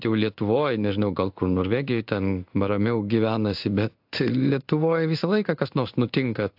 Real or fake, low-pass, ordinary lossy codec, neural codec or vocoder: fake; 5.4 kHz; AAC, 48 kbps; codec, 16 kHz in and 24 kHz out, 1 kbps, XY-Tokenizer